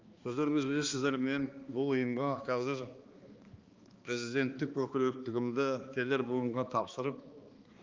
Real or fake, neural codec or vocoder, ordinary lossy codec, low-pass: fake; codec, 16 kHz, 2 kbps, X-Codec, HuBERT features, trained on balanced general audio; Opus, 32 kbps; 7.2 kHz